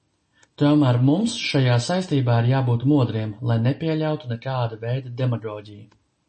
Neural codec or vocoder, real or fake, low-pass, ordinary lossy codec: none; real; 10.8 kHz; MP3, 32 kbps